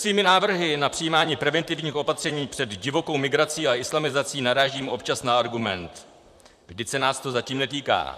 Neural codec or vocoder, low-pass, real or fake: vocoder, 44.1 kHz, 128 mel bands, Pupu-Vocoder; 14.4 kHz; fake